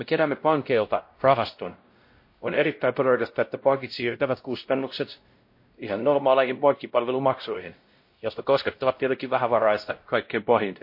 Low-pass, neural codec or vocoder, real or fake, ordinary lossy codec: 5.4 kHz; codec, 16 kHz, 0.5 kbps, X-Codec, WavLM features, trained on Multilingual LibriSpeech; fake; MP3, 32 kbps